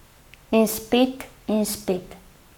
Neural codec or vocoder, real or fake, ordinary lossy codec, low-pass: codec, 44.1 kHz, 7.8 kbps, Pupu-Codec; fake; none; 19.8 kHz